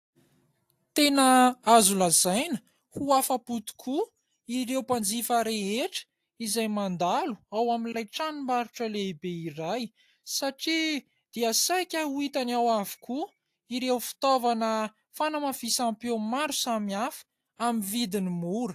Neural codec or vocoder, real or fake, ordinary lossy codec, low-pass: none; real; AAC, 64 kbps; 14.4 kHz